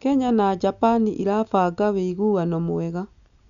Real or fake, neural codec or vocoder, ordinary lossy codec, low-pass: real; none; none; 7.2 kHz